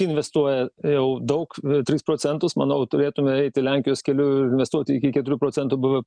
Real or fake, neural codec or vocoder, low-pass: real; none; 9.9 kHz